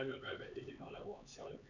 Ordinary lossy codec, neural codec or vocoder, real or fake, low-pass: none; codec, 16 kHz, 4 kbps, X-Codec, HuBERT features, trained on LibriSpeech; fake; 7.2 kHz